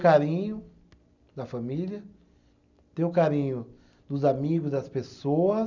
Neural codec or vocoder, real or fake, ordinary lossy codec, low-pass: none; real; none; 7.2 kHz